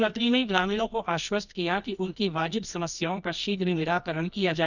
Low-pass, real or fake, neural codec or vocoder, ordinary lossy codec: 7.2 kHz; fake; codec, 24 kHz, 0.9 kbps, WavTokenizer, medium music audio release; none